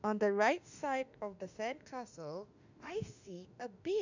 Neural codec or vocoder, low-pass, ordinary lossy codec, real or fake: autoencoder, 48 kHz, 32 numbers a frame, DAC-VAE, trained on Japanese speech; 7.2 kHz; none; fake